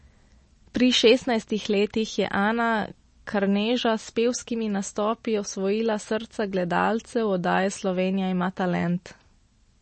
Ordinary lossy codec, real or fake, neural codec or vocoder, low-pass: MP3, 32 kbps; real; none; 10.8 kHz